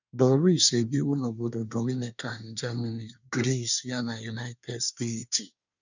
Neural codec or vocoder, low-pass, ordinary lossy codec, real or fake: codec, 24 kHz, 1 kbps, SNAC; 7.2 kHz; none; fake